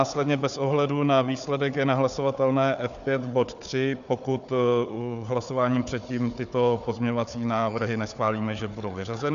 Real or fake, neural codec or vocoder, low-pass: fake; codec, 16 kHz, 4 kbps, FunCodec, trained on Chinese and English, 50 frames a second; 7.2 kHz